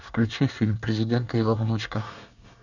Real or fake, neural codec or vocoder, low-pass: fake; codec, 24 kHz, 1 kbps, SNAC; 7.2 kHz